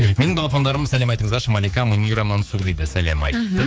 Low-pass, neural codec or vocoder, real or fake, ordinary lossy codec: none; codec, 16 kHz, 4 kbps, X-Codec, HuBERT features, trained on general audio; fake; none